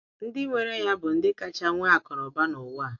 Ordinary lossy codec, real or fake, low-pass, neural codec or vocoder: MP3, 48 kbps; real; 7.2 kHz; none